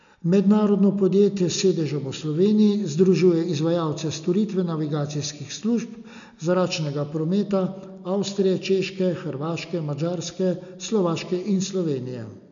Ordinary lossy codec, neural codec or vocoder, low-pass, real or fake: none; none; 7.2 kHz; real